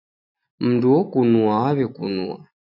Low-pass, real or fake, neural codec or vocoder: 5.4 kHz; real; none